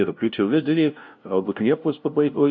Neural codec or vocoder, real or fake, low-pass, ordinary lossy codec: codec, 16 kHz, 0.5 kbps, FunCodec, trained on LibriTTS, 25 frames a second; fake; 7.2 kHz; MP3, 48 kbps